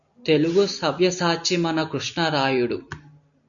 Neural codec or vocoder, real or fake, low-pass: none; real; 7.2 kHz